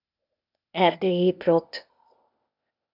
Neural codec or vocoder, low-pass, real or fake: codec, 16 kHz, 0.8 kbps, ZipCodec; 5.4 kHz; fake